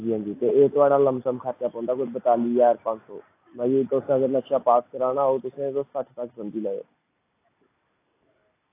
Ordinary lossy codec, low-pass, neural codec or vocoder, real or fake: AAC, 24 kbps; 3.6 kHz; none; real